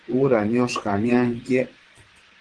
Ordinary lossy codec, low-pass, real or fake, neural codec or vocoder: Opus, 16 kbps; 9.9 kHz; fake; vocoder, 22.05 kHz, 80 mel bands, WaveNeXt